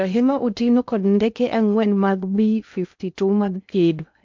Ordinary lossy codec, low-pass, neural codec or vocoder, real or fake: MP3, 64 kbps; 7.2 kHz; codec, 16 kHz in and 24 kHz out, 0.6 kbps, FocalCodec, streaming, 2048 codes; fake